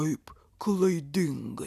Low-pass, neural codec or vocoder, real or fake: 14.4 kHz; none; real